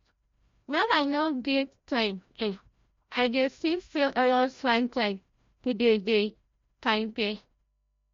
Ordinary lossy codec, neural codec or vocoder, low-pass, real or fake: MP3, 48 kbps; codec, 16 kHz, 0.5 kbps, FreqCodec, larger model; 7.2 kHz; fake